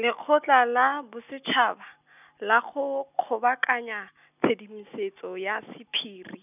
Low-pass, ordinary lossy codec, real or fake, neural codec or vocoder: 3.6 kHz; none; real; none